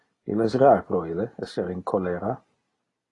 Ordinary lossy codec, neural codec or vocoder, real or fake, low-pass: AAC, 32 kbps; none; real; 10.8 kHz